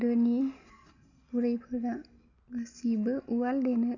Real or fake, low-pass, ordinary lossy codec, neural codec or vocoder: real; 7.2 kHz; none; none